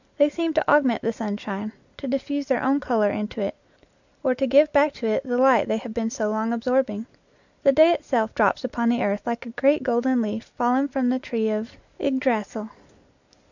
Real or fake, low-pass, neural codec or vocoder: real; 7.2 kHz; none